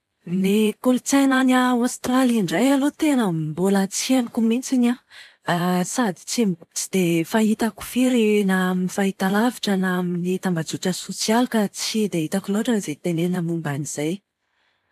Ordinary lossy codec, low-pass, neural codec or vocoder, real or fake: none; 14.4 kHz; vocoder, 44.1 kHz, 128 mel bands every 512 samples, BigVGAN v2; fake